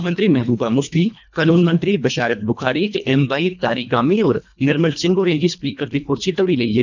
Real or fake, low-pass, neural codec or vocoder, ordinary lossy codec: fake; 7.2 kHz; codec, 24 kHz, 1.5 kbps, HILCodec; none